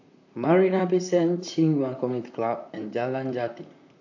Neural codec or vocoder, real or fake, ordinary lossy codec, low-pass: vocoder, 44.1 kHz, 128 mel bands, Pupu-Vocoder; fake; AAC, 48 kbps; 7.2 kHz